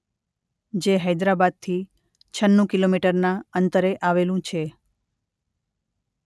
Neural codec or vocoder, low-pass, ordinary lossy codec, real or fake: none; none; none; real